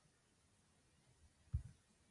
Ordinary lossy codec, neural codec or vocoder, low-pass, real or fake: AAC, 64 kbps; none; 10.8 kHz; real